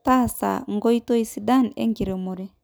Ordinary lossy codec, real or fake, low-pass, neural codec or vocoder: none; real; none; none